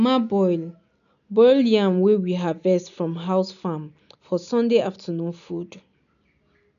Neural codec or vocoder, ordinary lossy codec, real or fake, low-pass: none; none; real; 7.2 kHz